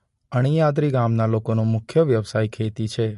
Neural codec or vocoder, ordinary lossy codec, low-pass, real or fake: none; MP3, 48 kbps; 14.4 kHz; real